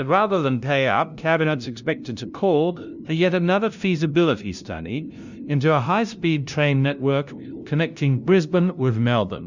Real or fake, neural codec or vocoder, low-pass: fake; codec, 16 kHz, 0.5 kbps, FunCodec, trained on LibriTTS, 25 frames a second; 7.2 kHz